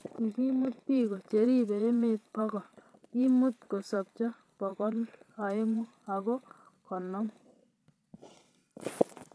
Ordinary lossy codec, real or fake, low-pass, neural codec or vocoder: none; fake; none; vocoder, 22.05 kHz, 80 mel bands, Vocos